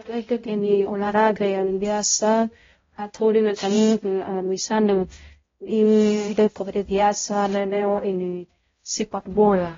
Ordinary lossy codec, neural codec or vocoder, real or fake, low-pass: AAC, 24 kbps; codec, 16 kHz, 0.5 kbps, X-Codec, HuBERT features, trained on balanced general audio; fake; 7.2 kHz